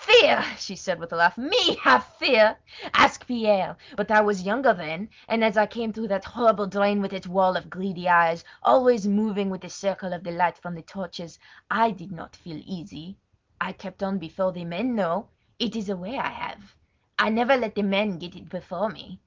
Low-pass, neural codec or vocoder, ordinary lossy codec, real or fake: 7.2 kHz; none; Opus, 32 kbps; real